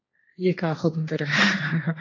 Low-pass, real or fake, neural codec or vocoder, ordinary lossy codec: 7.2 kHz; fake; codec, 16 kHz, 1.1 kbps, Voila-Tokenizer; AAC, 32 kbps